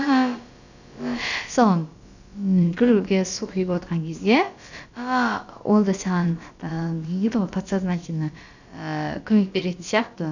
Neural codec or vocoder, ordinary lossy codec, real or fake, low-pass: codec, 16 kHz, about 1 kbps, DyCAST, with the encoder's durations; none; fake; 7.2 kHz